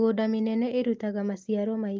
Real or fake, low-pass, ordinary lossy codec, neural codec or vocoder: real; 7.2 kHz; Opus, 24 kbps; none